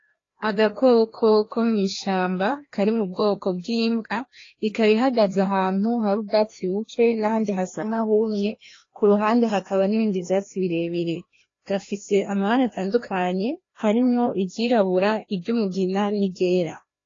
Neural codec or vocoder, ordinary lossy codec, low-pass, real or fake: codec, 16 kHz, 1 kbps, FreqCodec, larger model; AAC, 32 kbps; 7.2 kHz; fake